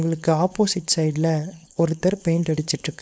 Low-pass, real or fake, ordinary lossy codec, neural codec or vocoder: none; fake; none; codec, 16 kHz, 4.8 kbps, FACodec